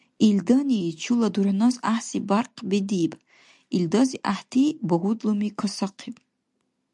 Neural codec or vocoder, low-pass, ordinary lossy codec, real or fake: none; 10.8 kHz; MP3, 96 kbps; real